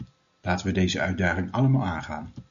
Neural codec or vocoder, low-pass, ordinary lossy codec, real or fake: none; 7.2 kHz; MP3, 96 kbps; real